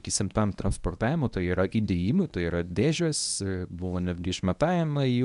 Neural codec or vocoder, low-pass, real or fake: codec, 24 kHz, 0.9 kbps, WavTokenizer, medium speech release version 1; 10.8 kHz; fake